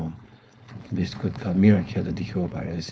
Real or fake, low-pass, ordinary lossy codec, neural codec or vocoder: fake; none; none; codec, 16 kHz, 4.8 kbps, FACodec